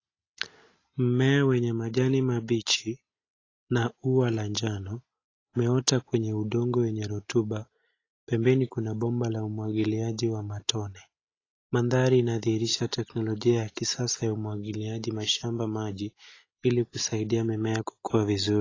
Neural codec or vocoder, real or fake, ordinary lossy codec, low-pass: none; real; AAC, 32 kbps; 7.2 kHz